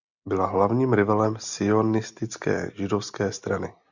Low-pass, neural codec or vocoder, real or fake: 7.2 kHz; none; real